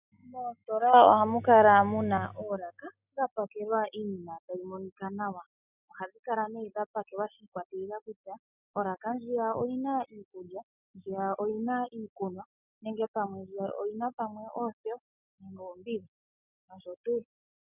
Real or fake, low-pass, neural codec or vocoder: real; 3.6 kHz; none